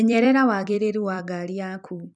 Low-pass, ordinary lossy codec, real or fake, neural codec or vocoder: 10.8 kHz; none; fake; vocoder, 44.1 kHz, 128 mel bands every 256 samples, BigVGAN v2